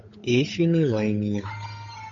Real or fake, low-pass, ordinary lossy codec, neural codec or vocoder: fake; 7.2 kHz; MP3, 48 kbps; codec, 16 kHz, 8 kbps, FunCodec, trained on Chinese and English, 25 frames a second